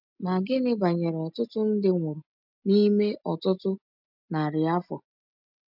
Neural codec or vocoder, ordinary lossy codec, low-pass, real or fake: none; none; 5.4 kHz; real